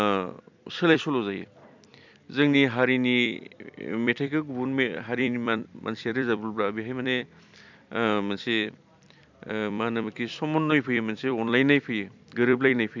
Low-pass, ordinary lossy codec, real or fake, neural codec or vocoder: 7.2 kHz; MP3, 64 kbps; fake; vocoder, 44.1 kHz, 128 mel bands every 256 samples, BigVGAN v2